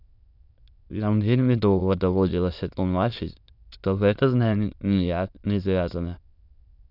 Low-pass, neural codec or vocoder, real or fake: 5.4 kHz; autoencoder, 22.05 kHz, a latent of 192 numbers a frame, VITS, trained on many speakers; fake